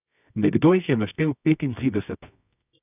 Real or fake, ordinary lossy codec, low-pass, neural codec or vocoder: fake; none; 3.6 kHz; codec, 24 kHz, 0.9 kbps, WavTokenizer, medium music audio release